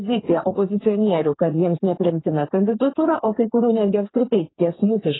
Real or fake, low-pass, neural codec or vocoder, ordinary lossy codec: fake; 7.2 kHz; codec, 32 kHz, 1.9 kbps, SNAC; AAC, 16 kbps